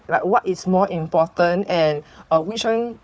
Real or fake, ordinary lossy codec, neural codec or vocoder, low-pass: fake; none; codec, 16 kHz, 4 kbps, FunCodec, trained on Chinese and English, 50 frames a second; none